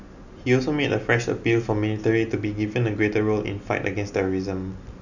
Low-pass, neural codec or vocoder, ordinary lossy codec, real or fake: 7.2 kHz; none; none; real